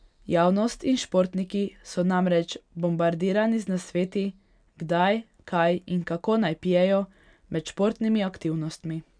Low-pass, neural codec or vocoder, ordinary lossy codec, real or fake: 9.9 kHz; none; none; real